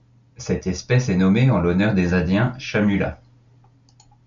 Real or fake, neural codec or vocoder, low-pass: real; none; 7.2 kHz